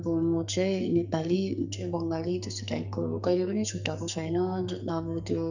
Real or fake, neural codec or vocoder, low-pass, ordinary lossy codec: fake; codec, 44.1 kHz, 2.6 kbps, SNAC; 7.2 kHz; none